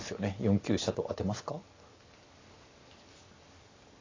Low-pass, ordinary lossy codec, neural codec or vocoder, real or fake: 7.2 kHz; AAC, 32 kbps; none; real